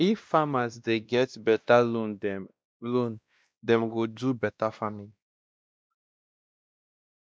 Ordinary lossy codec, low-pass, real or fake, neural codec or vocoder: none; none; fake; codec, 16 kHz, 1 kbps, X-Codec, WavLM features, trained on Multilingual LibriSpeech